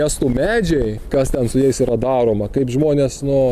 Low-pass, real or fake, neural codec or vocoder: 14.4 kHz; real; none